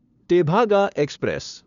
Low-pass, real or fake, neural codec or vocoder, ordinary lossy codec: 7.2 kHz; fake; codec, 16 kHz, 2 kbps, FunCodec, trained on LibriTTS, 25 frames a second; none